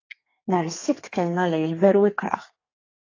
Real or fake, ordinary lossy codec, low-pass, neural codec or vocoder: fake; AAC, 32 kbps; 7.2 kHz; codec, 32 kHz, 1.9 kbps, SNAC